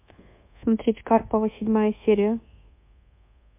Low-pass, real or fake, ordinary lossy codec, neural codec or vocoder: 3.6 kHz; fake; MP3, 24 kbps; codec, 24 kHz, 1.2 kbps, DualCodec